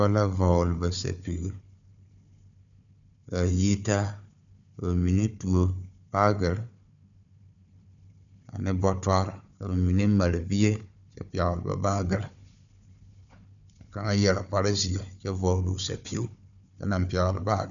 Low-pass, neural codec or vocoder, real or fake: 7.2 kHz; codec, 16 kHz, 4 kbps, FunCodec, trained on Chinese and English, 50 frames a second; fake